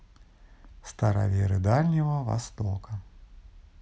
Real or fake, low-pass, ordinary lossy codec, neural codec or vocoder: real; none; none; none